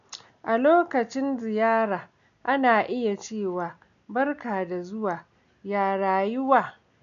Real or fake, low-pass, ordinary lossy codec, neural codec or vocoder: real; 7.2 kHz; none; none